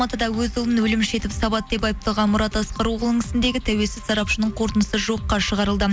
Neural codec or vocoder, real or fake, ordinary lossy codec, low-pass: none; real; none; none